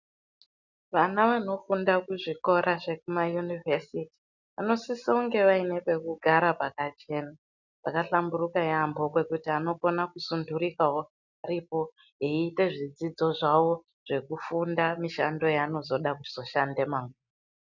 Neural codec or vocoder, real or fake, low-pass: none; real; 7.2 kHz